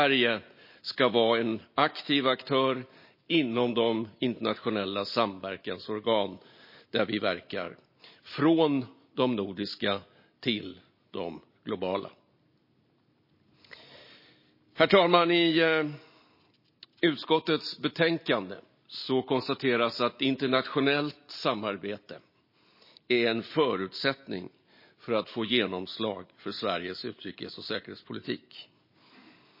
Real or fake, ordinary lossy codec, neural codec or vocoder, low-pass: real; MP3, 24 kbps; none; 5.4 kHz